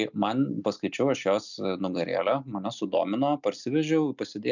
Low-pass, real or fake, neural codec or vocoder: 7.2 kHz; real; none